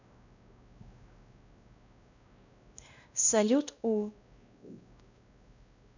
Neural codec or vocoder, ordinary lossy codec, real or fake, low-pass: codec, 16 kHz, 1 kbps, X-Codec, WavLM features, trained on Multilingual LibriSpeech; none; fake; 7.2 kHz